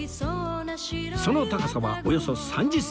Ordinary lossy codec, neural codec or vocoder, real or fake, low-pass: none; none; real; none